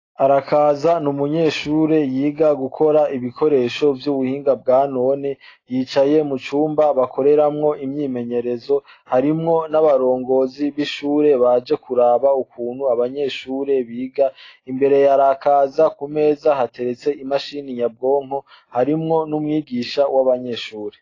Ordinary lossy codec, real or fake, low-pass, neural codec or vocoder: AAC, 32 kbps; real; 7.2 kHz; none